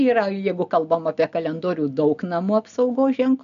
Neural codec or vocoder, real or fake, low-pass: none; real; 7.2 kHz